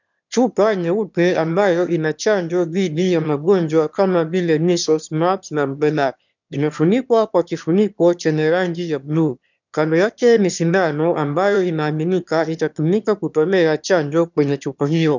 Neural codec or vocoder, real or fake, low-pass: autoencoder, 22.05 kHz, a latent of 192 numbers a frame, VITS, trained on one speaker; fake; 7.2 kHz